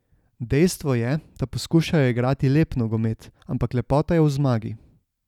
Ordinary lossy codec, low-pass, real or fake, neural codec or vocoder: none; 19.8 kHz; real; none